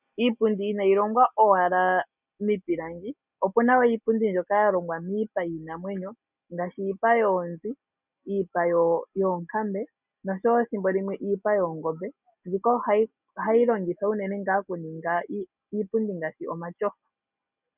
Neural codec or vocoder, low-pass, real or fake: none; 3.6 kHz; real